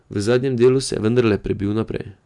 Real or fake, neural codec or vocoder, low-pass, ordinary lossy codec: real; none; 10.8 kHz; none